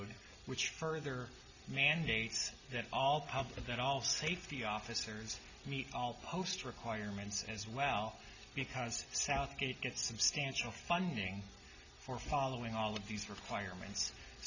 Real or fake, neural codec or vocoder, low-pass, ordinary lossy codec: real; none; 7.2 kHz; Opus, 64 kbps